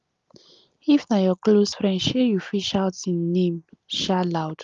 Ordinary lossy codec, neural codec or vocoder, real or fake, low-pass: Opus, 32 kbps; none; real; 7.2 kHz